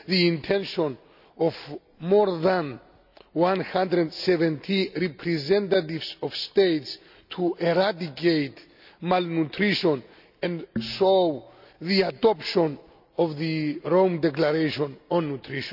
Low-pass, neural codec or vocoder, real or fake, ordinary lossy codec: 5.4 kHz; none; real; none